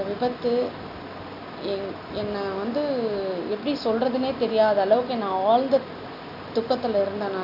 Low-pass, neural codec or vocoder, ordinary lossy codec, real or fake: 5.4 kHz; none; none; real